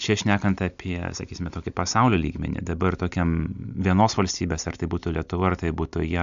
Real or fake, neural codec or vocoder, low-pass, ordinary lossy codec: real; none; 7.2 kHz; AAC, 96 kbps